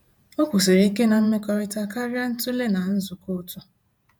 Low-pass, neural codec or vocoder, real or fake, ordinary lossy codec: none; vocoder, 48 kHz, 128 mel bands, Vocos; fake; none